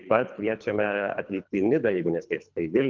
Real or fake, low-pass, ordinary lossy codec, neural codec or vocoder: fake; 7.2 kHz; Opus, 24 kbps; codec, 24 kHz, 3 kbps, HILCodec